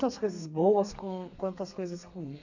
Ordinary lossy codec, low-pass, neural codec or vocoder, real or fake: none; 7.2 kHz; codec, 32 kHz, 1.9 kbps, SNAC; fake